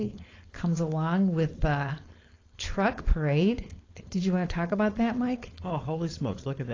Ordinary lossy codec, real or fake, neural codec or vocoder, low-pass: AAC, 32 kbps; fake; codec, 16 kHz, 4.8 kbps, FACodec; 7.2 kHz